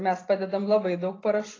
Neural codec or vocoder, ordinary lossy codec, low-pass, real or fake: none; AAC, 32 kbps; 7.2 kHz; real